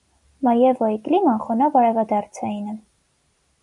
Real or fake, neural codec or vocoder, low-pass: real; none; 10.8 kHz